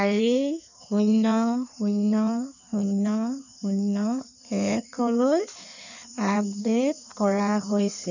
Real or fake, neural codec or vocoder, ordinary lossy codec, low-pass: fake; codec, 16 kHz in and 24 kHz out, 1.1 kbps, FireRedTTS-2 codec; none; 7.2 kHz